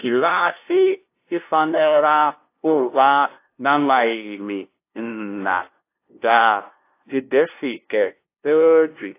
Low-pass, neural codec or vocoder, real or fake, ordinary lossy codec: 3.6 kHz; codec, 16 kHz, 0.5 kbps, FunCodec, trained on LibriTTS, 25 frames a second; fake; AAC, 24 kbps